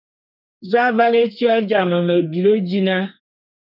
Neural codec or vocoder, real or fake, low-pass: codec, 32 kHz, 1.9 kbps, SNAC; fake; 5.4 kHz